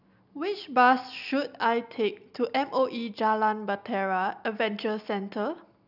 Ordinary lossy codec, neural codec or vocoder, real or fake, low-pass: none; none; real; 5.4 kHz